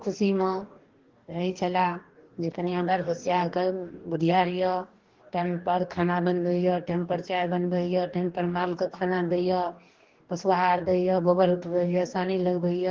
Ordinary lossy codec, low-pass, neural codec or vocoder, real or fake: Opus, 16 kbps; 7.2 kHz; codec, 44.1 kHz, 2.6 kbps, DAC; fake